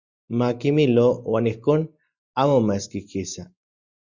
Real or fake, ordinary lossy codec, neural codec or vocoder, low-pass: real; Opus, 64 kbps; none; 7.2 kHz